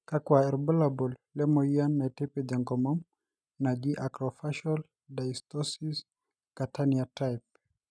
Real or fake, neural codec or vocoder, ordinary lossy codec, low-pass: real; none; none; none